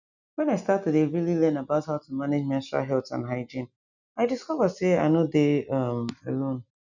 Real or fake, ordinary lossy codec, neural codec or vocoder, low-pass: real; none; none; 7.2 kHz